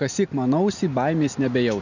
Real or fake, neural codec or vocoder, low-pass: real; none; 7.2 kHz